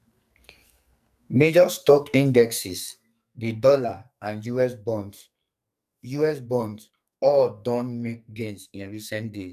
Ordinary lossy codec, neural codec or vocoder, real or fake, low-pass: none; codec, 44.1 kHz, 2.6 kbps, SNAC; fake; 14.4 kHz